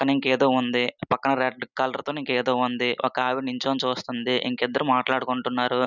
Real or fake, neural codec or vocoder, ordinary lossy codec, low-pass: real; none; none; 7.2 kHz